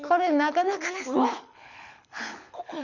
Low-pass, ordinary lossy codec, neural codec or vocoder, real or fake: 7.2 kHz; none; codec, 24 kHz, 6 kbps, HILCodec; fake